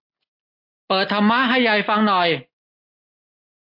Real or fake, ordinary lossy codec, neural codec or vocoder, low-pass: real; MP3, 32 kbps; none; 5.4 kHz